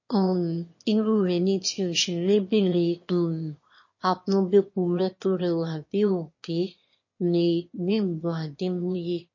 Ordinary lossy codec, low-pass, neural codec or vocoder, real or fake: MP3, 32 kbps; 7.2 kHz; autoencoder, 22.05 kHz, a latent of 192 numbers a frame, VITS, trained on one speaker; fake